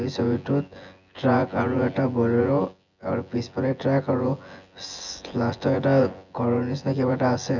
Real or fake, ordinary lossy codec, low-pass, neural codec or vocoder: fake; none; 7.2 kHz; vocoder, 24 kHz, 100 mel bands, Vocos